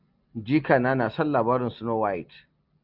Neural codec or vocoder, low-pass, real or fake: none; 5.4 kHz; real